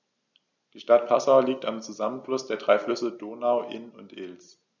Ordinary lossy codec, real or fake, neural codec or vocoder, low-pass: none; real; none; 7.2 kHz